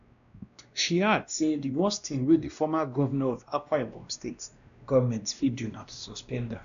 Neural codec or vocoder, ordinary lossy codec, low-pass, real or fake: codec, 16 kHz, 1 kbps, X-Codec, WavLM features, trained on Multilingual LibriSpeech; none; 7.2 kHz; fake